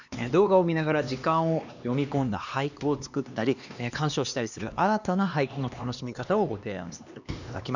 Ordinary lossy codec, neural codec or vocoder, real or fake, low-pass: none; codec, 16 kHz, 2 kbps, X-Codec, HuBERT features, trained on LibriSpeech; fake; 7.2 kHz